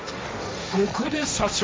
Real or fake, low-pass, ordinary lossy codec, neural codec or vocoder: fake; none; none; codec, 16 kHz, 1.1 kbps, Voila-Tokenizer